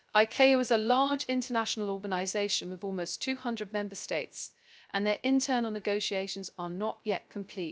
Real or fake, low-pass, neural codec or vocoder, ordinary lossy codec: fake; none; codec, 16 kHz, 0.3 kbps, FocalCodec; none